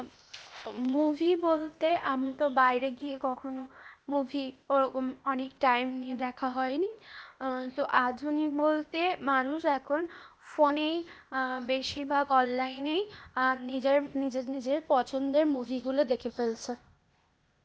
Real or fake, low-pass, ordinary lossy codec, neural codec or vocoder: fake; none; none; codec, 16 kHz, 0.8 kbps, ZipCodec